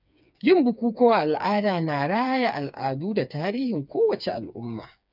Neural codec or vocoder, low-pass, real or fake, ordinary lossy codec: codec, 16 kHz, 4 kbps, FreqCodec, smaller model; 5.4 kHz; fake; none